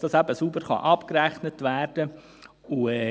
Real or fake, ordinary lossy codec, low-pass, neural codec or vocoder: real; none; none; none